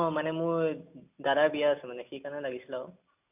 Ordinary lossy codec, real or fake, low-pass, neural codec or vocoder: none; real; 3.6 kHz; none